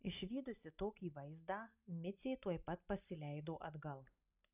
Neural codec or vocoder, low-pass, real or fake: none; 3.6 kHz; real